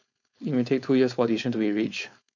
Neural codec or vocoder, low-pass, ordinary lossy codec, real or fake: codec, 16 kHz, 4.8 kbps, FACodec; 7.2 kHz; none; fake